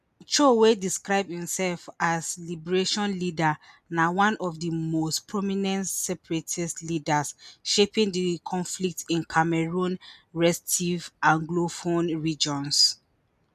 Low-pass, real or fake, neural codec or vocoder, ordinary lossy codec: 14.4 kHz; real; none; none